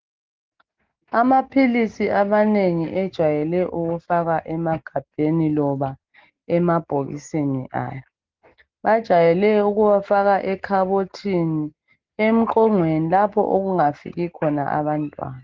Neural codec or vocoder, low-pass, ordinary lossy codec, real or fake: none; 7.2 kHz; Opus, 16 kbps; real